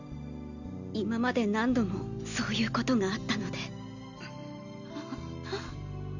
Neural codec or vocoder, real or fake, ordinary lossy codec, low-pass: none; real; none; 7.2 kHz